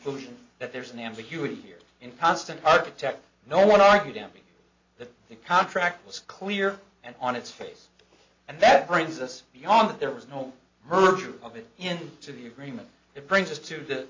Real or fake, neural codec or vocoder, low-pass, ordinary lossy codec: real; none; 7.2 kHz; MP3, 64 kbps